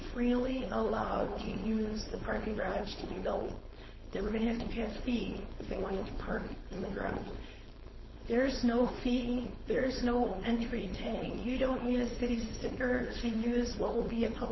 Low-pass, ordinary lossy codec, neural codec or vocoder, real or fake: 7.2 kHz; MP3, 24 kbps; codec, 16 kHz, 4.8 kbps, FACodec; fake